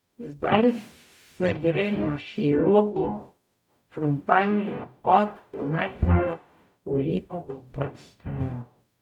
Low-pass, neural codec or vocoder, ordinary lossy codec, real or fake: 19.8 kHz; codec, 44.1 kHz, 0.9 kbps, DAC; none; fake